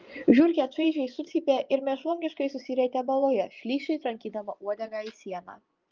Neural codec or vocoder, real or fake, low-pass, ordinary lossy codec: vocoder, 24 kHz, 100 mel bands, Vocos; fake; 7.2 kHz; Opus, 32 kbps